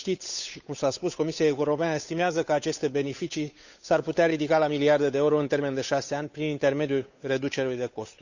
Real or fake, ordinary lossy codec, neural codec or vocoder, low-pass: fake; none; codec, 16 kHz, 8 kbps, FunCodec, trained on Chinese and English, 25 frames a second; 7.2 kHz